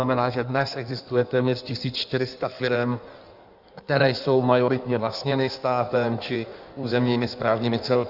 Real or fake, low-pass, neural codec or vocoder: fake; 5.4 kHz; codec, 16 kHz in and 24 kHz out, 1.1 kbps, FireRedTTS-2 codec